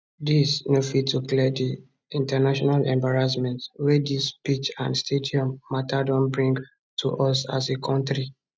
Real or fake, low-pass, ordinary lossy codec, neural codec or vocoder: real; none; none; none